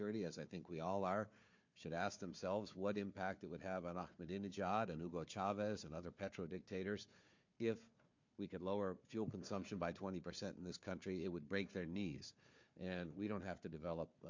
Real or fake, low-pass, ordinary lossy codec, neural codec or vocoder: fake; 7.2 kHz; MP3, 48 kbps; codec, 16 kHz, 4 kbps, FunCodec, trained on Chinese and English, 50 frames a second